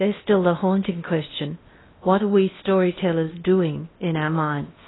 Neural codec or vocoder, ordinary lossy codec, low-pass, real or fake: codec, 16 kHz in and 24 kHz out, 0.8 kbps, FocalCodec, streaming, 65536 codes; AAC, 16 kbps; 7.2 kHz; fake